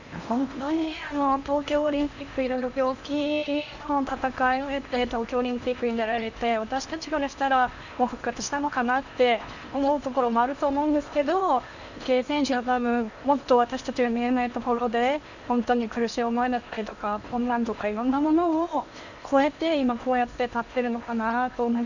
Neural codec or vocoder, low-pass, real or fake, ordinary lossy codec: codec, 16 kHz in and 24 kHz out, 0.8 kbps, FocalCodec, streaming, 65536 codes; 7.2 kHz; fake; none